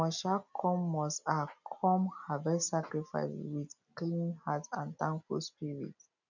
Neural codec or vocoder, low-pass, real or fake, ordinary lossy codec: none; 7.2 kHz; real; none